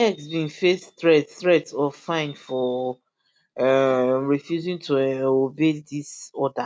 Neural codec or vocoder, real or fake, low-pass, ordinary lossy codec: none; real; none; none